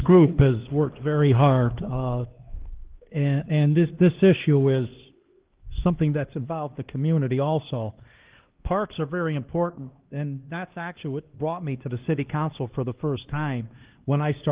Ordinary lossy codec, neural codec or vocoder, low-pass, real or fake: Opus, 16 kbps; codec, 16 kHz, 2 kbps, X-Codec, HuBERT features, trained on LibriSpeech; 3.6 kHz; fake